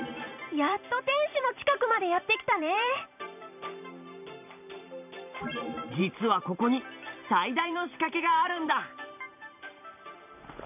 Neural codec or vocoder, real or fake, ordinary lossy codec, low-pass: vocoder, 44.1 kHz, 128 mel bands every 512 samples, BigVGAN v2; fake; none; 3.6 kHz